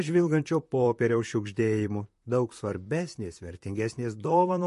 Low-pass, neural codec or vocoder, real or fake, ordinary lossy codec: 14.4 kHz; vocoder, 44.1 kHz, 128 mel bands, Pupu-Vocoder; fake; MP3, 48 kbps